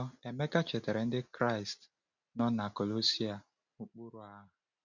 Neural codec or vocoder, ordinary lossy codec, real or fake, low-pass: none; none; real; 7.2 kHz